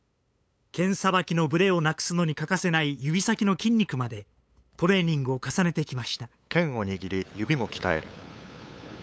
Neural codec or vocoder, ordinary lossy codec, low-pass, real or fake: codec, 16 kHz, 8 kbps, FunCodec, trained on LibriTTS, 25 frames a second; none; none; fake